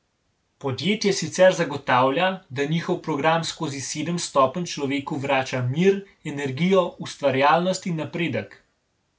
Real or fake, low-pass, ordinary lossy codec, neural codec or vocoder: real; none; none; none